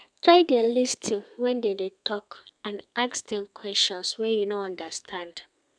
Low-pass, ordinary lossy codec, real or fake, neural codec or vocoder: 9.9 kHz; none; fake; codec, 32 kHz, 1.9 kbps, SNAC